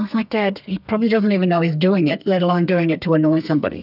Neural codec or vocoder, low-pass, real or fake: codec, 32 kHz, 1.9 kbps, SNAC; 5.4 kHz; fake